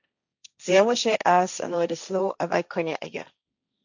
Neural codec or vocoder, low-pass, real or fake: codec, 16 kHz, 1.1 kbps, Voila-Tokenizer; 7.2 kHz; fake